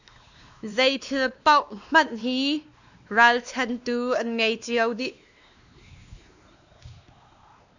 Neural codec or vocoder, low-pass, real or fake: codec, 16 kHz, 2 kbps, X-Codec, WavLM features, trained on Multilingual LibriSpeech; 7.2 kHz; fake